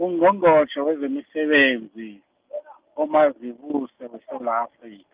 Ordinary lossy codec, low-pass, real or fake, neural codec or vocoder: Opus, 32 kbps; 3.6 kHz; real; none